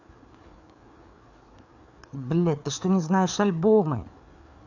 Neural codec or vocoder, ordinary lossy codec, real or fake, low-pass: codec, 16 kHz, 4 kbps, FreqCodec, larger model; none; fake; 7.2 kHz